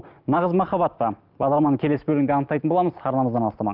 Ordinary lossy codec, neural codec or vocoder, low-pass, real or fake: Opus, 24 kbps; none; 5.4 kHz; real